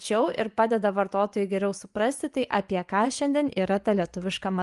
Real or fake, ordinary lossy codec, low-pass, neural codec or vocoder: fake; Opus, 32 kbps; 10.8 kHz; vocoder, 24 kHz, 100 mel bands, Vocos